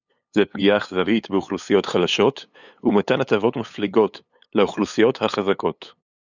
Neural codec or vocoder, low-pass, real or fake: codec, 16 kHz, 8 kbps, FunCodec, trained on LibriTTS, 25 frames a second; 7.2 kHz; fake